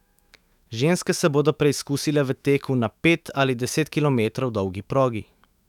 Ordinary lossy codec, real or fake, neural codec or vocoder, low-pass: none; fake; autoencoder, 48 kHz, 128 numbers a frame, DAC-VAE, trained on Japanese speech; 19.8 kHz